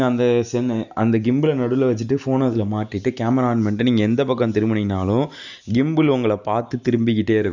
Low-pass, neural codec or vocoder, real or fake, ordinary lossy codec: 7.2 kHz; none; real; none